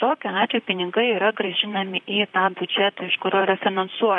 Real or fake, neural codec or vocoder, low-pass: fake; vocoder, 44.1 kHz, 128 mel bands, Pupu-Vocoder; 10.8 kHz